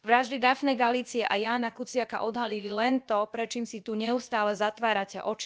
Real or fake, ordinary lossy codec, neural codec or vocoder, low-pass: fake; none; codec, 16 kHz, about 1 kbps, DyCAST, with the encoder's durations; none